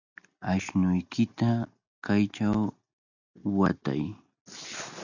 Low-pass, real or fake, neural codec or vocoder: 7.2 kHz; real; none